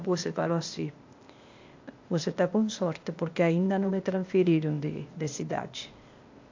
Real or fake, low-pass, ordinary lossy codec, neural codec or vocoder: fake; 7.2 kHz; MP3, 48 kbps; codec, 16 kHz, 0.8 kbps, ZipCodec